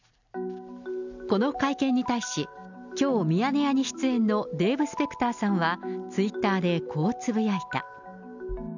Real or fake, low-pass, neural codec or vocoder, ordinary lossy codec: real; 7.2 kHz; none; none